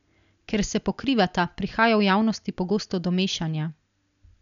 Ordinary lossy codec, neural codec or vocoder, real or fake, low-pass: none; none; real; 7.2 kHz